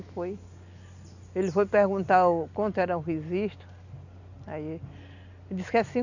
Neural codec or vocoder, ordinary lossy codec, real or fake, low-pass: none; none; real; 7.2 kHz